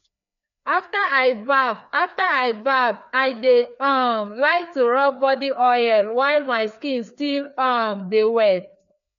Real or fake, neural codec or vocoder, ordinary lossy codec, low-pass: fake; codec, 16 kHz, 2 kbps, FreqCodec, larger model; none; 7.2 kHz